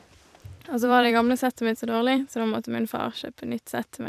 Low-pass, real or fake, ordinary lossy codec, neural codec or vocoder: 14.4 kHz; fake; none; vocoder, 44.1 kHz, 128 mel bands every 256 samples, BigVGAN v2